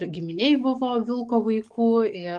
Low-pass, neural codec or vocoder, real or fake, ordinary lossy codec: 10.8 kHz; codec, 44.1 kHz, 7.8 kbps, DAC; fake; Opus, 24 kbps